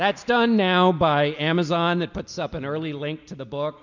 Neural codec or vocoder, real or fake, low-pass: none; real; 7.2 kHz